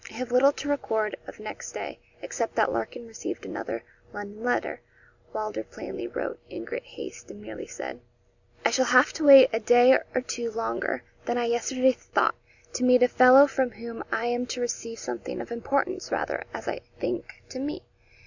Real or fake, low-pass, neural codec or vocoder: real; 7.2 kHz; none